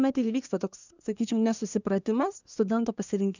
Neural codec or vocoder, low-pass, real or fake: codec, 24 kHz, 1 kbps, SNAC; 7.2 kHz; fake